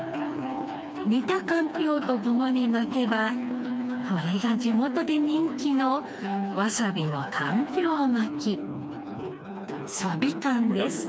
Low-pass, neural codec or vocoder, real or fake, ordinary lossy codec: none; codec, 16 kHz, 2 kbps, FreqCodec, smaller model; fake; none